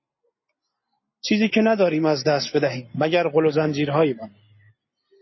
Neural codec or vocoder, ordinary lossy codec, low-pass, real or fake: none; MP3, 24 kbps; 7.2 kHz; real